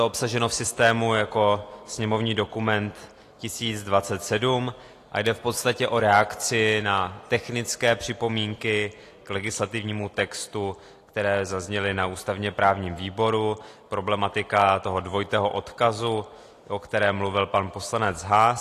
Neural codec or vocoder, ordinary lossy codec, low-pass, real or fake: none; AAC, 48 kbps; 14.4 kHz; real